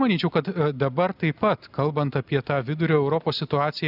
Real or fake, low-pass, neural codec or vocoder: real; 5.4 kHz; none